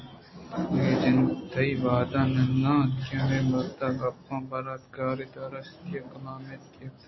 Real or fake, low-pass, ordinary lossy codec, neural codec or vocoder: real; 7.2 kHz; MP3, 24 kbps; none